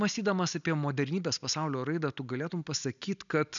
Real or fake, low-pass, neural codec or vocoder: real; 7.2 kHz; none